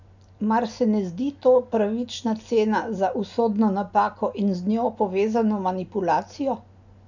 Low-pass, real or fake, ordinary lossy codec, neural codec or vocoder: 7.2 kHz; real; none; none